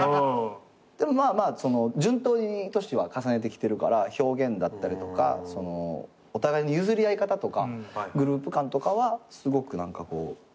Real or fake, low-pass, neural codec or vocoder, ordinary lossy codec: real; none; none; none